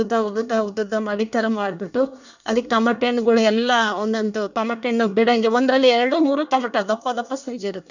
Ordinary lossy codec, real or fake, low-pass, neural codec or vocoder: none; fake; 7.2 kHz; codec, 24 kHz, 1 kbps, SNAC